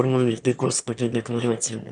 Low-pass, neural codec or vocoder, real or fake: 9.9 kHz; autoencoder, 22.05 kHz, a latent of 192 numbers a frame, VITS, trained on one speaker; fake